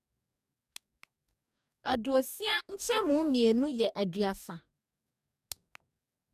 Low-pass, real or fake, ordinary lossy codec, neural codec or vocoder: 14.4 kHz; fake; none; codec, 44.1 kHz, 2.6 kbps, DAC